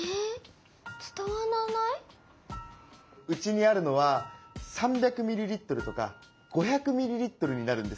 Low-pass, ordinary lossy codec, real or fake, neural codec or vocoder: none; none; real; none